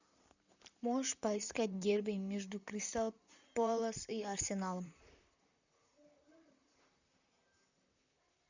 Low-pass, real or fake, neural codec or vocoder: 7.2 kHz; fake; vocoder, 44.1 kHz, 128 mel bands, Pupu-Vocoder